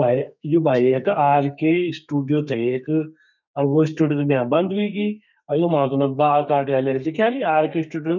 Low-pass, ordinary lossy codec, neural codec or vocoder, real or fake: 7.2 kHz; none; codec, 32 kHz, 1.9 kbps, SNAC; fake